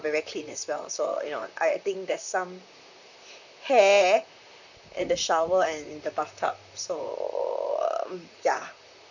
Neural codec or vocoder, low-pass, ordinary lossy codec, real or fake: vocoder, 44.1 kHz, 128 mel bands, Pupu-Vocoder; 7.2 kHz; none; fake